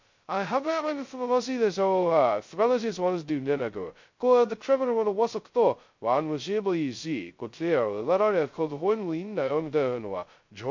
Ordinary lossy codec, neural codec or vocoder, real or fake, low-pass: MP3, 48 kbps; codec, 16 kHz, 0.2 kbps, FocalCodec; fake; 7.2 kHz